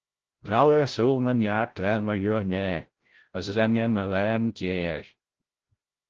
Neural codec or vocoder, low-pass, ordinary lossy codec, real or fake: codec, 16 kHz, 0.5 kbps, FreqCodec, larger model; 7.2 kHz; Opus, 16 kbps; fake